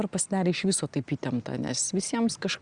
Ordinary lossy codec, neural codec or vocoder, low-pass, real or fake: Opus, 64 kbps; none; 9.9 kHz; real